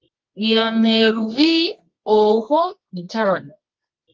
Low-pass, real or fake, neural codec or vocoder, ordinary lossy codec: 7.2 kHz; fake; codec, 24 kHz, 0.9 kbps, WavTokenizer, medium music audio release; Opus, 32 kbps